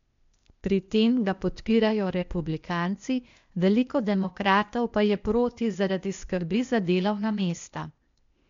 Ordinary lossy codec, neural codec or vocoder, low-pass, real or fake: MP3, 64 kbps; codec, 16 kHz, 0.8 kbps, ZipCodec; 7.2 kHz; fake